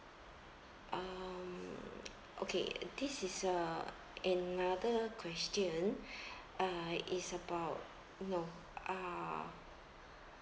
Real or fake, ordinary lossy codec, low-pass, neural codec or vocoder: real; none; none; none